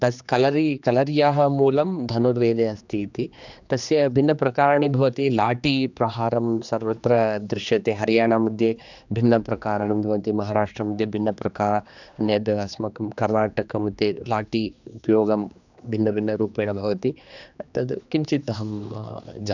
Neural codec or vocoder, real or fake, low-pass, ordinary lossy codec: codec, 16 kHz, 2 kbps, X-Codec, HuBERT features, trained on general audio; fake; 7.2 kHz; none